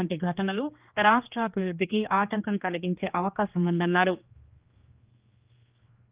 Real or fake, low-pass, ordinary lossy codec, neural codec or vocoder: fake; 3.6 kHz; Opus, 24 kbps; codec, 16 kHz, 2 kbps, X-Codec, HuBERT features, trained on general audio